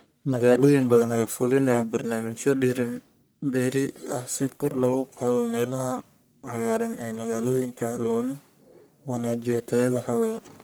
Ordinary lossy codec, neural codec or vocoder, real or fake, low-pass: none; codec, 44.1 kHz, 1.7 kbps, Pupu-Codec; fake; none